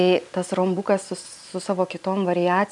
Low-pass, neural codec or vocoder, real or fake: 10.8 kHz; none; real